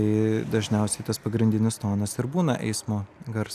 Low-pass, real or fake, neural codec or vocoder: 14.4 kHz; real; none